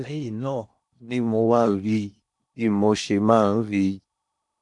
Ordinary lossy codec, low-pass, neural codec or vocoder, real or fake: MP3, 96 kbps; 10.8 kHz; codec, 16 kHz in and 24 kHz out, 0.8 kbps, FocalCodec, streaming, 65536 codes; fake